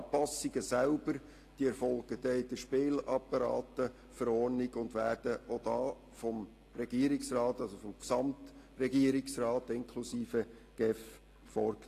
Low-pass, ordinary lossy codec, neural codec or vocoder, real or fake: 14.4 kHz; AAC, 48 kbps; none; real